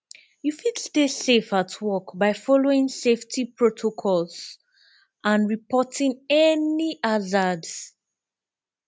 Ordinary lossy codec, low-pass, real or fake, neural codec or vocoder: none; none; real; none